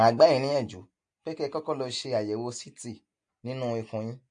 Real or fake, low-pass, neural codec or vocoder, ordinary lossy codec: real; 9.9 kHz; none; MP3, 48 kbps